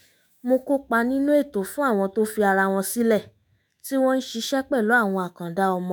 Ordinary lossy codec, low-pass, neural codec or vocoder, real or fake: none; none; autoencoder, 48 kHz, 128 numbers a frame, DAC-VAE, trained on Japanese speech; fake